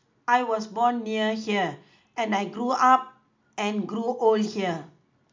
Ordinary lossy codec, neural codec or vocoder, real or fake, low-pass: MP3, 64 kbps; none; real; 7.2 kHz